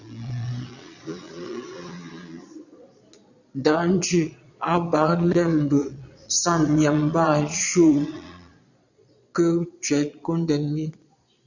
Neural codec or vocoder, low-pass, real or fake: vocoder, 22.05 kHz, 80 mel bands, Vocos; 7.2 kHz; fake